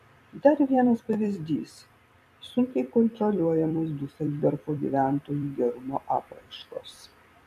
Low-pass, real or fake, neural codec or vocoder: 14.4 kHz; fake; vocoder, 44.1 kHz, 128 mel bands every 256 samples, BigVGAN v2